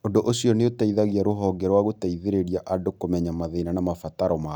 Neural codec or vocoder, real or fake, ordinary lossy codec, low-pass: none; real; none; none